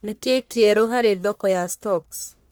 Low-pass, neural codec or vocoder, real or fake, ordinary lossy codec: none; codec, 44.1 kHz, 1.7 kbps, Pupu-Codec; fake; none